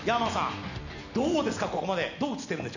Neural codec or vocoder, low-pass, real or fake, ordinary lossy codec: none; 7.2 kHz; real; none